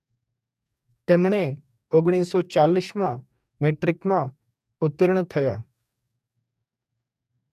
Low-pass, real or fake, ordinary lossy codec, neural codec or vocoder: 14.4 kHz; fake; MP3, 96 kbps; codec, 44.1 kHz, 2.6 kbps, DAC